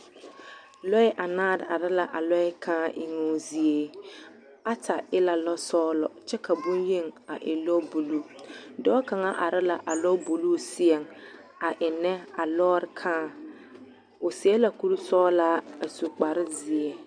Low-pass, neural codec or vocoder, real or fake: 9.9 kHz; none; real